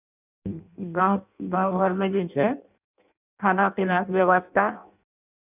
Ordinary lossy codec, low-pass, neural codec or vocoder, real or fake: none; 3.6 kHz; codec, 16 kHz in and 24 kHz out, 0.6 kbps, FireRedTTS-2 codec; fake